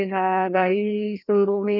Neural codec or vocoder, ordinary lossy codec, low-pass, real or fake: codec, 16 kHz, 1 kbps, FreqCodec, larger model; none; 5.4 kHz; fake